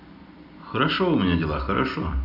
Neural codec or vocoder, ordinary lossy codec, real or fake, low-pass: none; none; real; 5.4 kHz